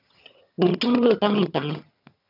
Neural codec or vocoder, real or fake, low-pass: vocoder, 22.05 kHz, 80 mel bands, HiFi-GAN; fake; 5.4 kHz